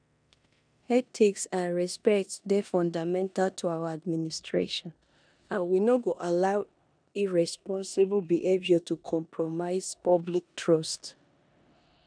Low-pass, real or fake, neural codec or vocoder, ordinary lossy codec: 9.9 kHz; fake; codec, 16 kHz in and 24 kHz out, 0.9 kbps, LongCat-Audio-Codec, four codebook decoder; none